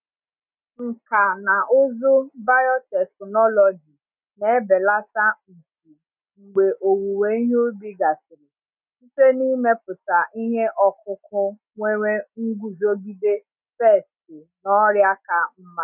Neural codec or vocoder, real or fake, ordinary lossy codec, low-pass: none; real; none; 3.6 kHz